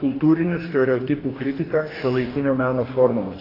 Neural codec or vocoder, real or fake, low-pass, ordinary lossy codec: codec, 44.1 kHz, 2.6 kbps, DAC; fake; 5.4 kHz; AAC, 24 kbps